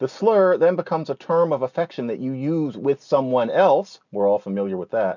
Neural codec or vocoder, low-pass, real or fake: none; 7.2 kHz; real